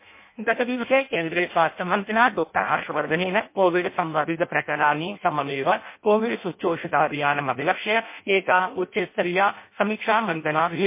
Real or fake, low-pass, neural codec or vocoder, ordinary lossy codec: fake; 3.6 kHz; codec, 16 kHz in and 24 kHz out, 0.6 kbps, FireRedTTS-2 codec; MP3, 24 kbps